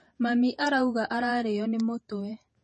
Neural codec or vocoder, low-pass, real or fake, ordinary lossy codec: vocoder, 48 kHz, 128 mel bands, Vocos; 10.8 kHz; fake; MP3, 32 kbps